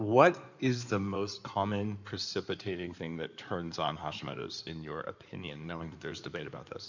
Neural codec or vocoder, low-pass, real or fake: codec, 16 kHz in and 24 kHz out, 2.2 kbps, FireRedTTS-2 codec; 7.2 kHz; fake